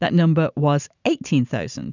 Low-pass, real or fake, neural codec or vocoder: 7.2 kHz; fake; vocoder, 44.1 kHz, 128 mel bands every 512 samples, BigVGAN v2